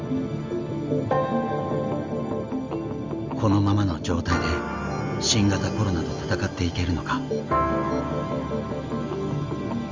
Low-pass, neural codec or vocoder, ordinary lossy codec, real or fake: 7.2 kHz; none; Opus, 32 kbps; real